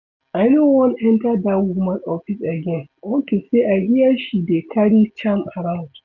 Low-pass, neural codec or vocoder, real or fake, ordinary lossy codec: 7.2 kHz; none; real; none